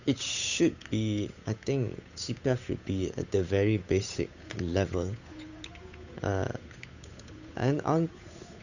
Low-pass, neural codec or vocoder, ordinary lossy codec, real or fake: 7.2 kHz; codec, 16 kHz, 8 kbps, FunCodec, trained on Chinese and English, 25 frames a second; none; fake